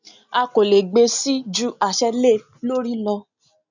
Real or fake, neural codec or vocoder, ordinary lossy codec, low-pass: real; none; none; 7.2 kHz